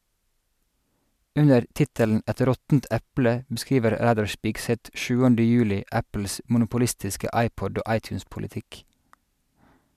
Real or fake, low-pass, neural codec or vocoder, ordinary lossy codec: real; 14.4 kHz; none; MP3, 96 kbps